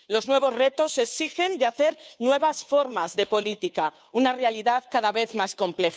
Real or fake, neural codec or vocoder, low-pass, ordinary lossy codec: fake; codec, 16 kHz, 2 kbps, FunCodec, trained on Chinese and English, 25 frames a second; none; none